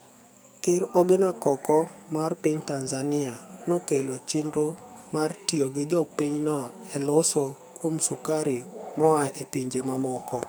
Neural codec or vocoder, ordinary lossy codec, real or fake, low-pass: codec, 44.1 kHz, 2.6 kbps, SNAC; none; fake; none